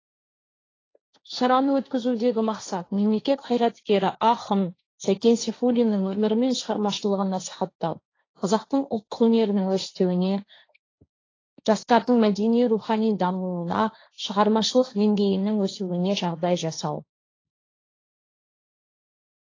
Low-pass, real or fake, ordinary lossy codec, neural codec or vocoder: 7.2 kHz; fake; AAC, 32 kbps; codec, 16 kHz, 1.1 kbps, Voila-Tokenizer